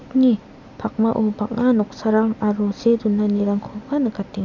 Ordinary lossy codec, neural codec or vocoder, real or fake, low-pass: none; none; real; 7.2 kHz